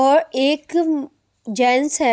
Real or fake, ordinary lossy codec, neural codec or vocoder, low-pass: real; none; none; none